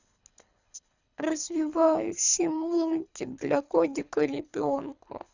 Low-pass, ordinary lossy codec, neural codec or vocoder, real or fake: 7.2 kHz; none; codec, 24 kHz, 3 kbps, HILCodec; fake